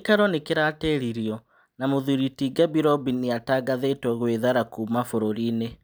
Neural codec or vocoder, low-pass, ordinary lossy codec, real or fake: none; none; none; real